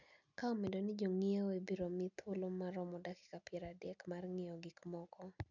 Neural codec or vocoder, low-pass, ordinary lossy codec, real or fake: none; 7.2 kHz; none; real